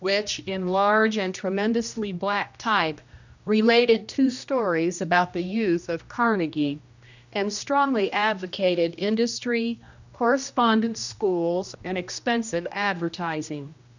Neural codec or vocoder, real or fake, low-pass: codec, 16 kHz, 1 kbps, X-Codec, HuBERT features, trained on general audio; fake; 7.2 kHz